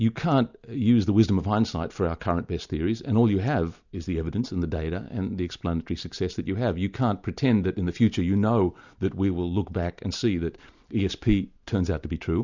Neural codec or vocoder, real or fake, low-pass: none; real; 7.2 kHz